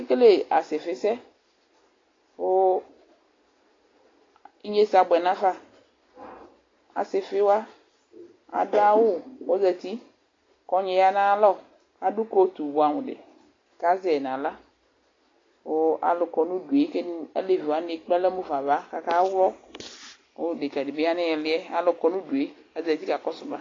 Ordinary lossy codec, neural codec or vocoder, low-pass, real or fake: AAC, 32 kbps; none; 7.2 kHz; real